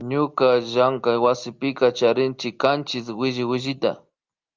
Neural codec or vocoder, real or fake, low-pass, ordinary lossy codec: none; real; 7.2 kHz; Opus, 24 kbps